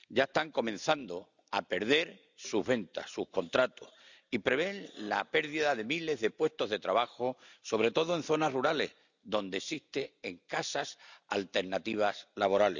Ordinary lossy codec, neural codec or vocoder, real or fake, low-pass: none; none; real; 7.2 kHz